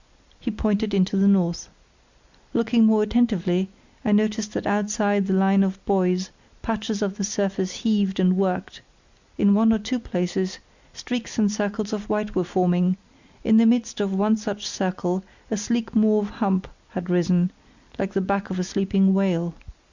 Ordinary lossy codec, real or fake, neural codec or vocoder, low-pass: Opus, 64 kbps; real; none; 7.2 kHz